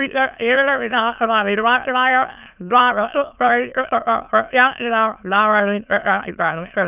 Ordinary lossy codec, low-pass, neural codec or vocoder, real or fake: none; 3.6 kHz; autoencoder, 22.05 kHz, a latent of 192 numbers a frame, VITS, trained on many speakers; fake